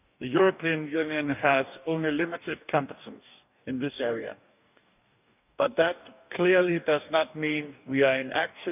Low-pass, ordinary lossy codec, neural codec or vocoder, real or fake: 3.6 kHz; none; codec, 44.1 kHz, 2.6 kbps, DAC; fake